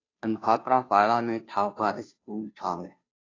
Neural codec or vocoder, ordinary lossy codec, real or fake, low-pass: codec, 16 kHz, 0.5 kbps, FunCodec, trained on Chinese and English, 25 frames a second; AAC, 32 kbps; fake; 7.2 kHz